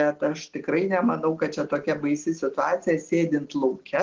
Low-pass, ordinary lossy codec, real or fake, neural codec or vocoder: 7.2 kHz; Opus, 16 kbps; real; none